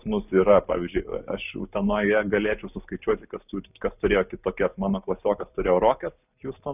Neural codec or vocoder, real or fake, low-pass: none; real; 3.6 kHz